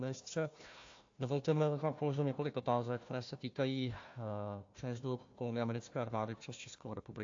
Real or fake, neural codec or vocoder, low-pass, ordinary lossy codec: fake; codec, 16 kHz, 1 kbps, FunCodec, trained on Chinese and English, 50 frames a second; 7.2 kHz; AAC, 48 kbps